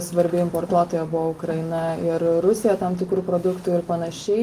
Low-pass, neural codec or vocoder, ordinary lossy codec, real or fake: 14.4 kHz; none; Opus, 32 kbps; real